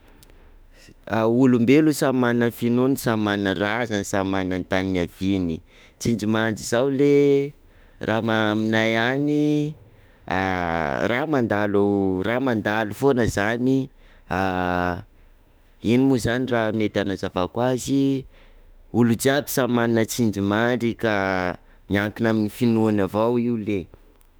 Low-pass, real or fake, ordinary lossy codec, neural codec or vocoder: none; fake; none; autoencoder, 48 kHz, 32 numbers a frame, DAC-VAE, trained on Japanese speech